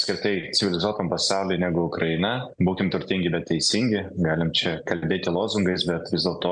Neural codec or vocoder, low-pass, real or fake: none; 9.9 kHz; real